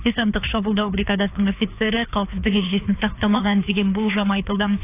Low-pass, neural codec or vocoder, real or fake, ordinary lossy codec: 3.6 kHz; codec, 16 kHz, 2 kbps, FreqCodec, larger model; fake; AAC, 24 kbps